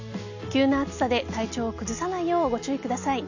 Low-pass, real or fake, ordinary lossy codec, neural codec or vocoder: 7.2 kHz; real; none; none